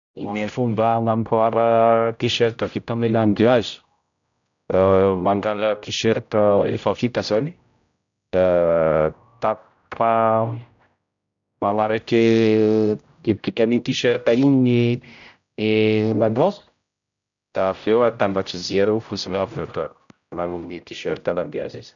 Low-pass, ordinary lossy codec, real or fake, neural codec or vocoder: 7.2 kHz; none; fake; codec, 16 kHz, 0.5 kbps, X-Codec, HuBERT features, trained on general audio